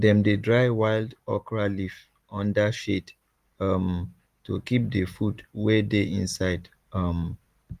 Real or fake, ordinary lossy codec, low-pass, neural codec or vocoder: real; Opus, 24 kbps; 14.4 kHz; none